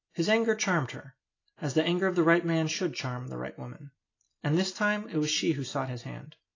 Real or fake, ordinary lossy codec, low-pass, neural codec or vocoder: real; AAC, 32 kbps; 7.2 kHz; none